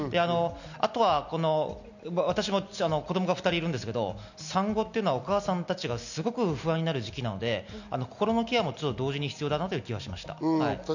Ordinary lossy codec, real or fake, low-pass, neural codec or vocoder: none; real; 7.2 kHz; none